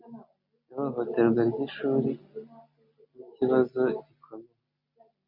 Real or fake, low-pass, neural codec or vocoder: real; 5.4 kHz; none